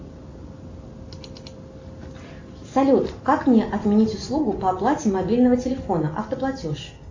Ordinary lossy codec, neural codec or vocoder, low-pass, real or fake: AAC, 48 kbps; none; 7.2 kHz; real